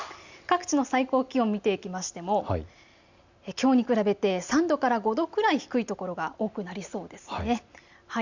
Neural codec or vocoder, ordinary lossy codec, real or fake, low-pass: none; Opus, 64 kbps; real; 7.2 kHz